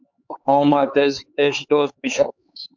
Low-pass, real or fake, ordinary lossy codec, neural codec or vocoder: 7.2 kHz; fake; MP3, 64 kbps; codec, 16 kHz, 4 kbps, X-Codec, HuBERT features, trained on LibriSpeech